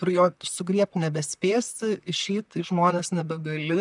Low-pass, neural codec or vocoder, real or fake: 10.8 kHz; vocoder, 44.1 kHz, 128 mel bands, Pupu-Vocoder; fake